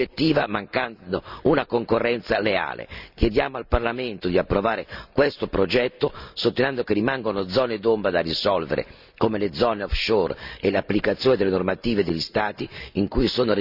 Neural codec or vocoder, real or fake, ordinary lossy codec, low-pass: none; real; none; 5.4 kHz